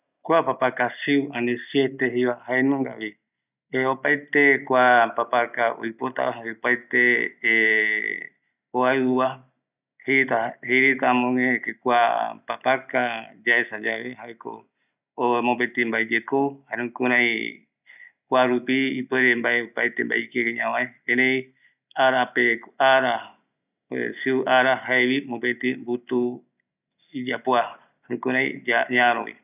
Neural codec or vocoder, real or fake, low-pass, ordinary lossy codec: none; real; 3.6 kHz; none